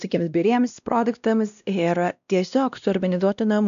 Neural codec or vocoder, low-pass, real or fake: codec, 16 kHz, 1 kbps, X-Codec, WavLM features, trained on Multilingual LibriSpeech; 7.2 kHz; fake